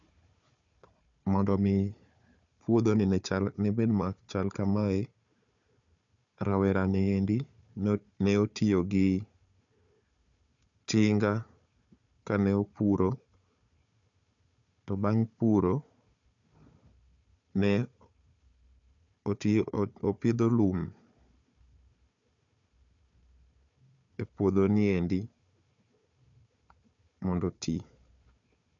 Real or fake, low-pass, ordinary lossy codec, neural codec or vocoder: fake; 7.2 kHz; MP3, 96 kbps; codec, 16 kHz, 4 kbps, FunCodec, trained on Chinese and English, 50 frames a second